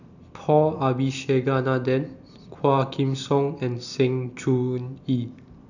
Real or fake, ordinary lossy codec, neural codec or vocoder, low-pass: real; none; none; 7.2 kHz